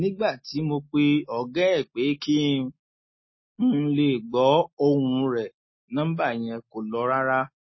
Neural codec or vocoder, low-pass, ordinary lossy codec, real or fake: none; 7.2 kHz; MP3, 24 kbps; real